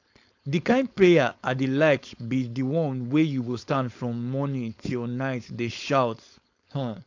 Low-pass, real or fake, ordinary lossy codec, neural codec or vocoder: 7.2 kHz; fake; AAC, 48 kbps; codec, 16 kHz, 4.8 kbps, FACodec